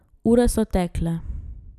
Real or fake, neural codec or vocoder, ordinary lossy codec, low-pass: real; none; none; 14.4 kHz